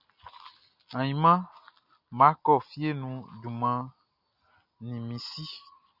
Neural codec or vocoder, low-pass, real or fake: none; 5.4 kHz; real